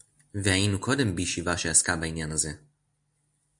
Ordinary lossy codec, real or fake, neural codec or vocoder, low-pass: MP3, 96 kbps; real; none; 10.8 kHz